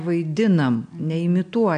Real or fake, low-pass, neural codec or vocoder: real; 9.9 kHz; none